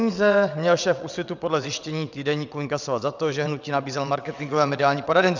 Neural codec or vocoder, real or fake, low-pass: vocoder, 22.05 kHz, 80 mel bands, WaveNeXt; fake; 7.2 kHz